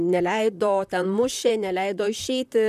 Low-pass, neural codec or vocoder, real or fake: 14.4 kHz; vocoder, 44.1 kHz, 128 mel bands, Pupu-Vocoder; fake